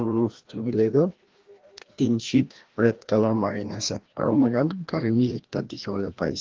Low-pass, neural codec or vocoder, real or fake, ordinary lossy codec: 7.2 kHz; codec, 16 kHz, 1 kbps, FreqCodec, larger model; fake; Opus, 16 kbps